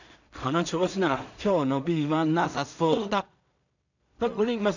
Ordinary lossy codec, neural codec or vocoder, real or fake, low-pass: none; codec, 16 kHz in and 24 kHz out, 0.4 kbps, LongCat-Audio-Codec, two codebook decoder; fake; 7.2 kHz